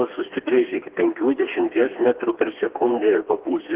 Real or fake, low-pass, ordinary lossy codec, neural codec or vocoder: fake; 3.6 kHz; Opus, 16 kbps; codec, 16 kHz, 2 kbps, FreqCodec, smaller model